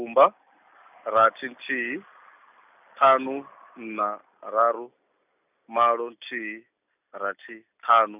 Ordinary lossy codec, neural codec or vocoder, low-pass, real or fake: none; none; 3.6 kHz; real